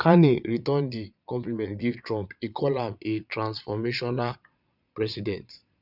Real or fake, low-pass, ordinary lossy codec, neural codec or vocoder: fake; 5.4 kHz; none; vocoder, 22.05 kHz, 80 mel bands, Vocos